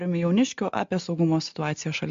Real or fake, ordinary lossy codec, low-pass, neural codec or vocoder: real; MP3, 48 kbps; 7.2 kHz; none